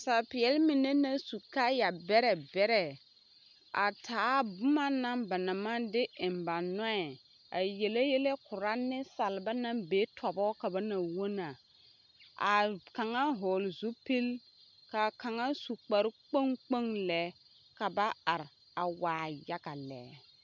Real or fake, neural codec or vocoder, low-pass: real; none; 7.2 kHz